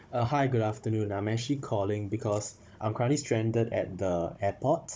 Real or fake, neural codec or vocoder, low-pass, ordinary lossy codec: fake; codec, 16 kHz, 16 kbps, FunCodec, trained on Chinese and English, 50 frames a second; none; none